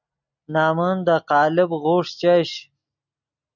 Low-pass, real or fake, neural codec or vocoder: 7.2 kHz; real; none